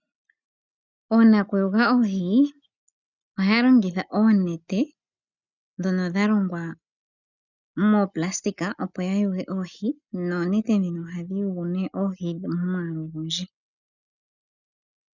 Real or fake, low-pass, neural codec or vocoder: real; 7.2 kHz; none